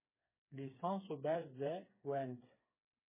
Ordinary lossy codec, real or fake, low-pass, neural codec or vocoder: MP3, 16 kbps; fake; 3.6 kHz; codec, 16 kHz, 4 kbps, FreqCodec, smaller model